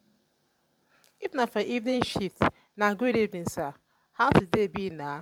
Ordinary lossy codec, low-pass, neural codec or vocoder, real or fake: MP3, 96 kbps; 19.8 kHz; vocoder, 44.1 kHz, 128 mel bands every 512 samples, BigVGAN v2; fake